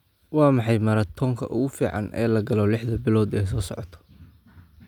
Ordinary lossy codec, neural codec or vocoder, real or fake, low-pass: none; none; real; 19.8 kHz